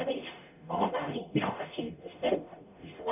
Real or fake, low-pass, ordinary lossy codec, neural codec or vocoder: fake; 3.6 kHz; none; codec, 44.1 kHz, 0.9 kbps, DAC